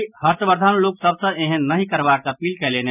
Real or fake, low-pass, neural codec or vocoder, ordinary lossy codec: real; 3.6 kHz; none; none